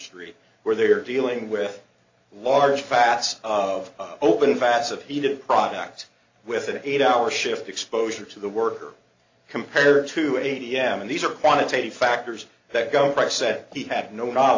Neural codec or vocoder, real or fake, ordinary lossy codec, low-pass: none; real; AAC, 48 kbps; 7.2 kHz